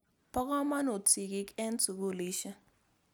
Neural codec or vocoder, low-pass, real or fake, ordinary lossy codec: none; none; real; none